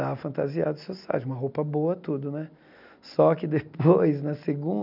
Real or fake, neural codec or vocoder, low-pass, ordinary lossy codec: real; none; 5.4 kHz; none